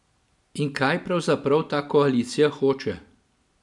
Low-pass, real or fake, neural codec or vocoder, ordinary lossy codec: 10.8 kHz; real; none; MP3, 96 kbps